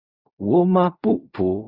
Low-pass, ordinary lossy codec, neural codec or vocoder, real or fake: 5.4 kHz; Opus, 64 kbps; codec, 16 kHz in and 24 kHz out, 0.4 kbps, LongCat-Audio-Codec, fine tuned four codebook decoder; fake